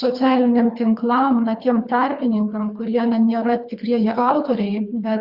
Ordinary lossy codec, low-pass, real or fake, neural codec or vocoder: Opus, 64 kbps; 5.4 kHz; fake; codec, 24 kHz, 3 kbps, HILCodec